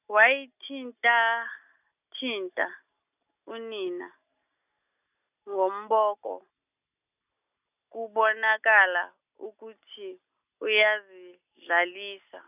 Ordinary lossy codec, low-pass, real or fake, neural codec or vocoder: none; 3.6 kHz; real; none